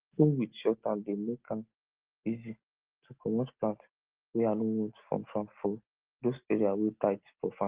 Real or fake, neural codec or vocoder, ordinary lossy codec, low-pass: real; none; Opus, 16 kbps; 3.6 kHz